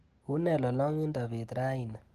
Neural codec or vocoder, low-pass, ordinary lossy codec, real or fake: none; 14.4 kHz; Opus, 24 kbps; real